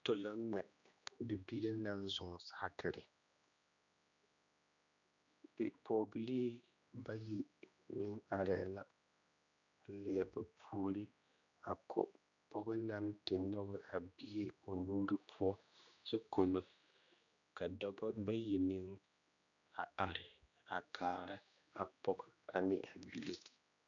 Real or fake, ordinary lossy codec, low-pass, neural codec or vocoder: fake; MP3, 96 kbps; 7.2 kHz; codec, 16 kHz, 1 kbps, X-Codec, HuBERT features, trained on general audio